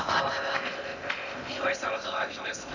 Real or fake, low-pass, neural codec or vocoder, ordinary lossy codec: fake; 7.2 kHz; codec, 16 kHz in and 24 kHz out, 0.6 kbps, FocalCodec, streaming, 2048 codes; none